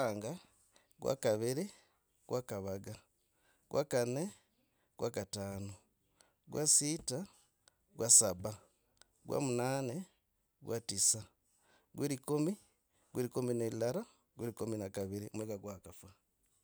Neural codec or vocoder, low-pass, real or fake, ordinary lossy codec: none; none; real; none